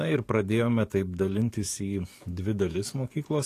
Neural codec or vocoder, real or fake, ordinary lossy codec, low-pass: codec, 44.1 kHz, 7.8 kbps, DAC; fake; AAC, 48 kbps; 14.4 kHz